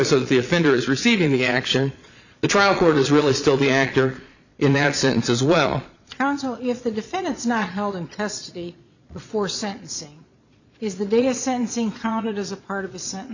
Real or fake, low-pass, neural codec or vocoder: fake; 7.2 kHz; vocoder, 22.05 kHz, 80 mel bands, Vocos